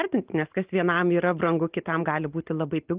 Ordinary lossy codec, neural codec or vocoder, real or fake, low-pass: Opus, 32 kbps; none; real; 3.6 kHz